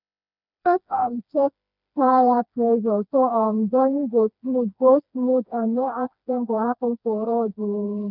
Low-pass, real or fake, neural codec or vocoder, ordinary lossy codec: 5.4 kHz; fake; codec, 16 kHz, 2 kbps, FreqCodec, smaller model; none